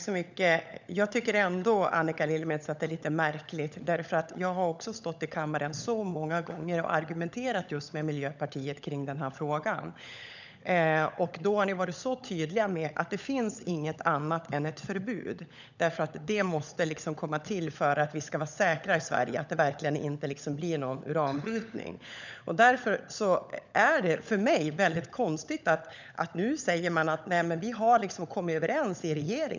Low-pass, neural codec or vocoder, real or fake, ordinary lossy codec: 7.2 kHz; codec, 16 kHz, 16 kbps, FunCodec, trained on LibriTTS, 50 frames a second; fake; none